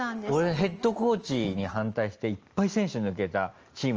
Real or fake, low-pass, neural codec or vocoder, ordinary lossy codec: real; 7.2 kHz; none; Opus, 24 kbps